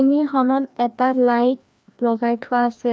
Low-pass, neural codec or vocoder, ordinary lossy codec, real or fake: none; codec, 16 kHz, 1 kbps, FreqCodec, larger model; none; fake